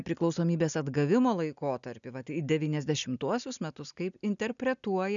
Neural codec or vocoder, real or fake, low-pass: none; real; 7.2 kHz